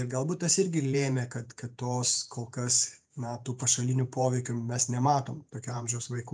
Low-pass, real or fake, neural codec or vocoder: 9.9 kHz; fake; vocoder, 48 kHz, 128 mel bands, Vocos